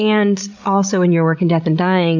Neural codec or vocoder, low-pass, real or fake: codec, 16 kHz, 16 kbps, FreqCodec, larger model; 7.2 kHz; fake